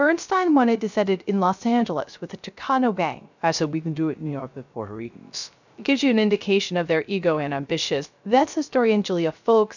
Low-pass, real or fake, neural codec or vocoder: 7.2 kHz; fake; codec, 16 kHz, 0.3 kbps, FocalCodec